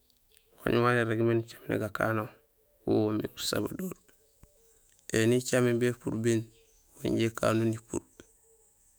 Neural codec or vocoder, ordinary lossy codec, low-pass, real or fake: autoencoder, 48 kHz, 128 numbers a frame, DAC-VAE, trained on Japanese speech; none; none; fake